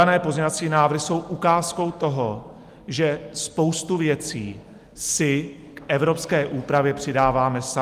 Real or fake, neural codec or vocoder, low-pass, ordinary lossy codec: real; none; 14.4 kHz; Opus, 32 kbps